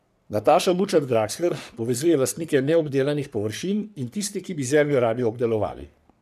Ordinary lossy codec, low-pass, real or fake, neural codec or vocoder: none; 14.4 kHz; fake; codec, 44.1 kHz, 3.4 kbps, Pupu-Codec